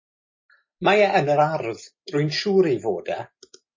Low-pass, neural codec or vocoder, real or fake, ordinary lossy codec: 7.2 kHz; none; real; MP3, 32 kbps